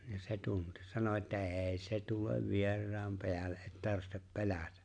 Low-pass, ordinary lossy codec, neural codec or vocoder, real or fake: 9.9 kHz; AAC, 64 kbps; none; real